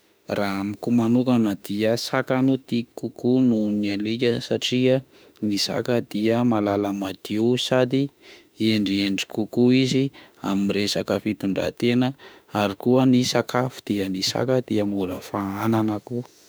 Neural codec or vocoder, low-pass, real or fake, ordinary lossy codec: autoencoder, 48 kHz, 32 numbers a frame, DAC-VAE, trained on Japanese speech; none; fake; none